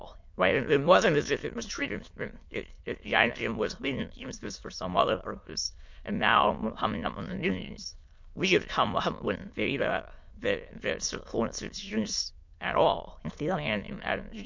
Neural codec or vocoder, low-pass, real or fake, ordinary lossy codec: autoencoder, 22.05 kHz, a latent of 192 numbers a frame, VITS, trained on many speakers; 7.2 kHz; fake; MP3, 48 kbps